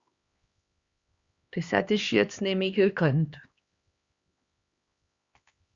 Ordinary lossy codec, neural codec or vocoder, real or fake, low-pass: Opus, 64 kbps; codec, 16 kHz, 2 kbps, X-Codec, HuBERT features, trained on LibriSpeech; fake; 7.2 kHz